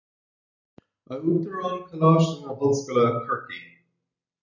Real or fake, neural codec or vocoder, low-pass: real; none; 7.2 kHz